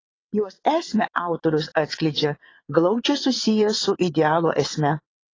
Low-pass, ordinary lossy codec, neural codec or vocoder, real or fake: 7.2 kHz; AAC, 32 kbps; vocoder, 22.05 kHz, 80 mel bands, WaveNeXt; fake